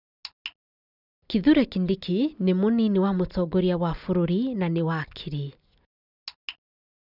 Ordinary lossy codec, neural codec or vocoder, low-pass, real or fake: none; none; 5.4 kHz; real